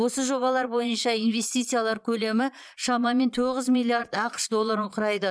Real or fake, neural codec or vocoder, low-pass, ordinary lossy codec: fake; vocoder, 22.05 kHz, 80 mel bands, Vocos; none; none